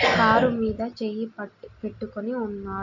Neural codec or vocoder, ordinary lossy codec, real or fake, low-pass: none; none; real; 7.2 kHz